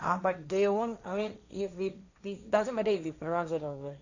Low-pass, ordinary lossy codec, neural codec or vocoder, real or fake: 7.2 kHz; none; codec, 16 kHz, 1.1 kbps, Voila-Tokenizer; fake